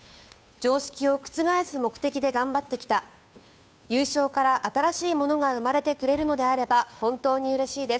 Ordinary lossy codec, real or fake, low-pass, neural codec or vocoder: none; fake; none; codec, 16 kHz, 2 kbps, FunCodec, trained on Chinese and English, 25 frames a second